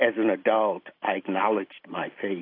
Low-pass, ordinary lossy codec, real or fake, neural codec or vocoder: 5.4 kHz; AAC, 32 kbps; real; none